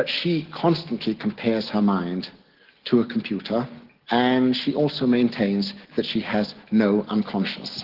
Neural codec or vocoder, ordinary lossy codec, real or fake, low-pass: none; Opus, 16 kbps; real; 5.4 kHz